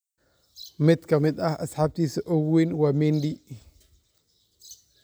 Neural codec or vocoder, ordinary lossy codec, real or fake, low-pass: none; none; real; none